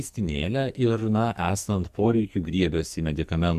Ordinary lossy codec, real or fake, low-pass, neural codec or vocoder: Opus, 64 kbps; fake; 14.4 kHz; codec, 44.1 kHz, 2.6 kbps, SNAC